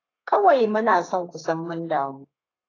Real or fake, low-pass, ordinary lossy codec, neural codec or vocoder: fake; 7.2 kHz; AAC, 32 kbps; codec, 32 kHz, 1.9 kbps, SNAC